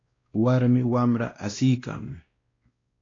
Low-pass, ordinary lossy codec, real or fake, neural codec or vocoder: 7.2 kHz; AAC, 32 kbps; fake; codec, 16 kHz, 1 kbps, X-Codec, WavLM features, trained on Multilingual LibriSpeech